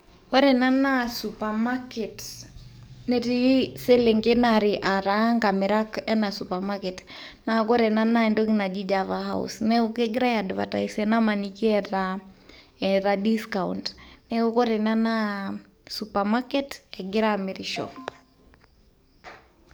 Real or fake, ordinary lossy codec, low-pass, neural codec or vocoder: fake; none; none; codec, 44.1 kHz, 7.8 kbps, DAC